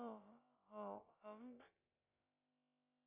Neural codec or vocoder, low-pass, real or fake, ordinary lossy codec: codec, 16 kHz, about 1 kbps, DyCAST, with the encoder's durations; 3.6 kHz; fake; MP3, 32 kbps